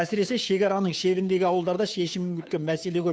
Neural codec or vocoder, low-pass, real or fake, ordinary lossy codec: codec, 16 kHz, 2 kbps, FunCodec, trained on Chinese and English, 25 frames a second; none; fake; none